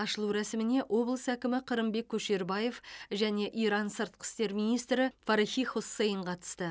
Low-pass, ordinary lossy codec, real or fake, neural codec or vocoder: none; none; real; none